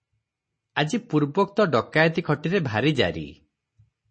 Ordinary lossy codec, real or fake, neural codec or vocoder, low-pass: MP3, 32 kbps; real; none; 9.9 kHz